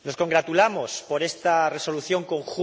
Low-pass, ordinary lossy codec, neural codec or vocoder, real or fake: none; none; none; real